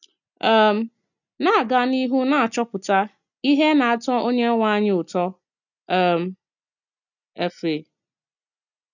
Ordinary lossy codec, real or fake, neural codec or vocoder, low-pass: none; real; none; 7.2 kHz